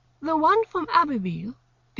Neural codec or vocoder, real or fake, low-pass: none; real; 7.2 kHz